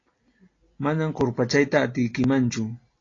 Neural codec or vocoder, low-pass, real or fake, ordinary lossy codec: none; 7.2 kHz; real; AAC, 32 kbps